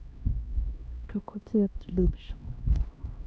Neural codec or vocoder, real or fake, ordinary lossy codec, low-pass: codec, 16 kHz, 1 kbps, X-Codec, HuBERT features, trained on LibriSpeech; fake; none; none